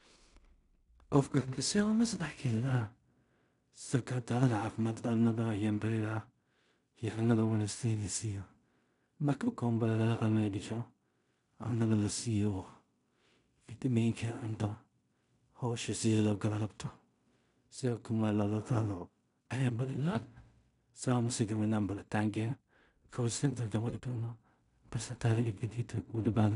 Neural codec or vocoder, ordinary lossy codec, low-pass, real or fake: codec, 16 kHz in and 24 kHz out, 0.4 kbps, LongCat-Audio-Codec, two codebook decoder; none; 10.8 kHz; fake